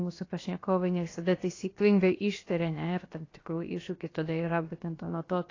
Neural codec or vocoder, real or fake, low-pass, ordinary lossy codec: codec, 16 kHz, 0.7 kbps, FocalCodec; fake; 7.2 kHz; AAC, 32 kbps